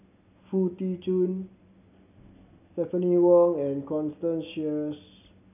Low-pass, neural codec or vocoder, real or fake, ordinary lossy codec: 3.6 kHz; none; real; none